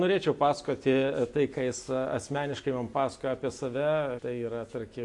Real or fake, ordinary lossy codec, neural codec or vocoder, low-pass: real; AAC, 64 kbps; none; 10.8 kHz